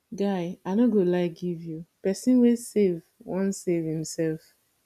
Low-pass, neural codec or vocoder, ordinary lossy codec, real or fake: 14.4 kHz; none; none; real